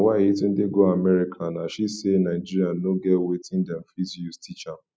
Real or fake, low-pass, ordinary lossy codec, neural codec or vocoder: real; none; none; none